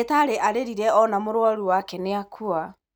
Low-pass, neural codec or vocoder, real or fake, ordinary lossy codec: none; none; real; none